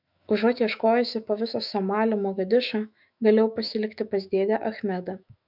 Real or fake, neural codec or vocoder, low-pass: fake; autoencoder, 48 kHz, 128 numbers a frame, DAC-VAE, trained on Japanese speech; 5.4 kHz